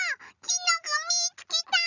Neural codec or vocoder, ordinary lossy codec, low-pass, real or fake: none; none; 7.2 kHz; real